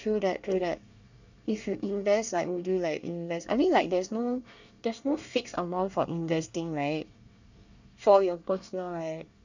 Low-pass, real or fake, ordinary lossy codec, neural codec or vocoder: 7.2 kHz; fake; none; codec, 24 kHz, 1 kbps, SNAC